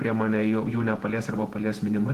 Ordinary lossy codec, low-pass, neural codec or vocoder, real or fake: Opus, 16 kbps; 14.4 kHz; vocoder, 48 kHz, 128 mel bands, Vocos; fake